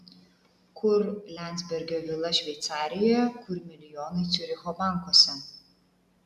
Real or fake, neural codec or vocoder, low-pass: real; none; 14.4 kHz